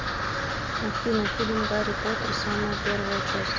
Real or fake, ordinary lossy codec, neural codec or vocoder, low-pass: real; Opus, 32 kbps; none; 7.2 kHz